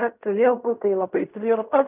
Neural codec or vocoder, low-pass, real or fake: codec, 16 kHz in and 24 kHz out, 0.4 kbps, LongCat-Audio-Codec, fine tuned four codebook decoder; 3.6 kHz; fake